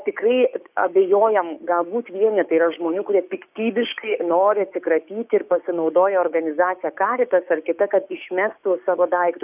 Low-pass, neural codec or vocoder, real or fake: 3.6 kHz; codec, 16 kHz, 6 kbps, DAC; fake